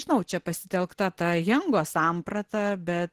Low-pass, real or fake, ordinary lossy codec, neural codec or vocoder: 14.4 kHz; real; Opus, 16 kbps; none